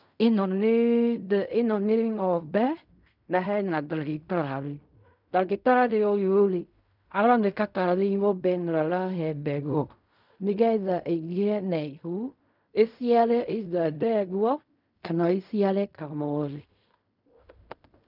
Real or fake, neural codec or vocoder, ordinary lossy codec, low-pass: fake; codec, 16 kHz in and 24 kHz out, 0.4 kbps, LongCat-Audio-Codec, fine tuned four codebook decoder; none; 5.4 kHz